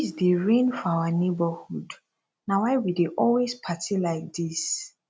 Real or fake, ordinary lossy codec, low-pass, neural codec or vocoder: real; none; none; none